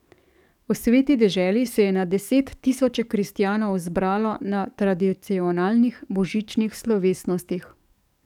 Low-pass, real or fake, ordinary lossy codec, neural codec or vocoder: 19.8 kHz; fake; none; codec, 44.1 kHz, 7.8 kbps, DAC